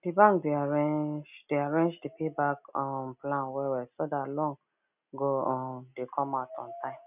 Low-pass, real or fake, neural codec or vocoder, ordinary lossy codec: 3.6 kHz; real; none; none